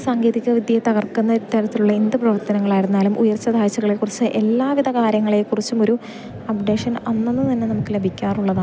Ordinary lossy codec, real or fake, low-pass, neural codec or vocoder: none; real; none; none